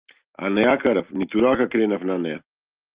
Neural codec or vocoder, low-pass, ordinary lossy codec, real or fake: none; 3.6 kHz; Opus, 24 kbps; real